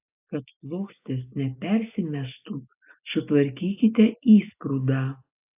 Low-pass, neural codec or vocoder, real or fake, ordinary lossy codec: 3.6 kHz; none; real; AAC, 32 kbps